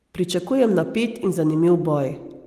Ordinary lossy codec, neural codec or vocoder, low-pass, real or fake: Opus, 24 kbps; none; 14.4 kHz; real